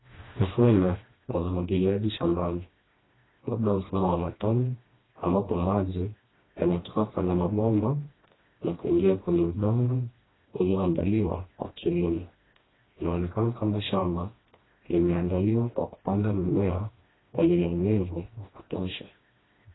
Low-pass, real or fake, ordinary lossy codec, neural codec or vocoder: 7.2 kHz; fake; AAC, 16 kbps; codec, 16 kHz, 1 kbps, FreqCodec, smaller model